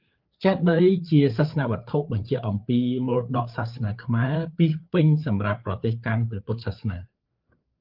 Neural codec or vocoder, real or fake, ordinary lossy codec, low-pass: codec, 16 kHz, 4 kbps, FreqCodec, larger model; fake; Opus, 32 kbps; 5.4 kHz